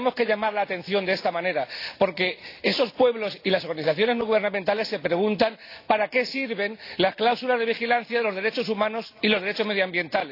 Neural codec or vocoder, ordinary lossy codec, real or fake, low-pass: none; AAC, 32 kbps; real; 5.4 kHz